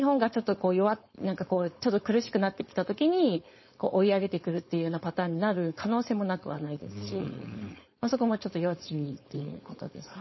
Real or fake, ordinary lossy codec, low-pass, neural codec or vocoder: fake; MP3, 24 kbps; 7.2 kHz; codec, 16 kHz, 4.8 kbps, FACodec